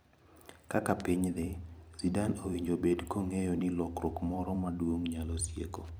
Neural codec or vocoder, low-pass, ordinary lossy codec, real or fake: vocoder, 44.1 kHz, 128 mel bands every 256 samples, BigVGAN v2; none; none; fake